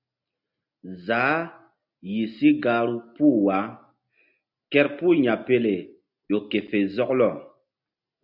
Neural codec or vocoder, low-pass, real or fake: none; 5.4 kHz; real